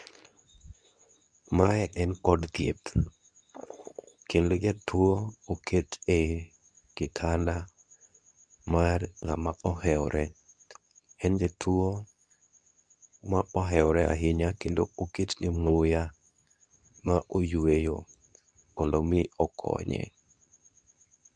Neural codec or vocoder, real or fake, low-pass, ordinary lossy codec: codec, 24 kHz, 0.9 kbps, WavTokenizer, medium speech release version 2; fake; 9.9 kHz; none